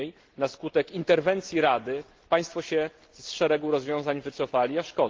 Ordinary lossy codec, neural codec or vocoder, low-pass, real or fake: Opus, 16 kbps; none; 7.2 kHz; real